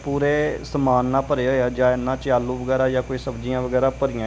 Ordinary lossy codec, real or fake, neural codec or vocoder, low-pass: none; real; none; none